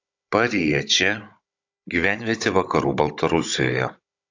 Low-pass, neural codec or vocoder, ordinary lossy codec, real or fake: 7.2 kHz; codec, 16 kHz, 16 kbps, FunCodec, trained on Chinese and English, 50 frames a second; AAC, 48 kbps; fake